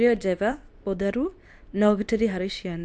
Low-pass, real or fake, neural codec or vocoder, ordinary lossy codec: none; fake; codec, 24 kHz, 0.9 kbps, WavTokenizer, medium speech release version 2; none